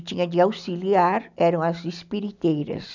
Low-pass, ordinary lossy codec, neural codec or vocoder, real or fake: 7.2 kHz; none; none; real